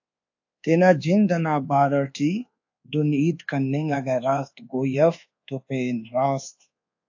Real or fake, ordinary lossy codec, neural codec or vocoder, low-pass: fake; AAC, 48 kbps; codec, 24 kHz, 1.2 kbps, DualCodec; 7.2 kHz